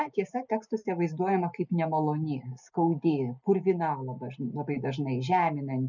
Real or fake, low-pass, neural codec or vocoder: real; 7.2 kHz; none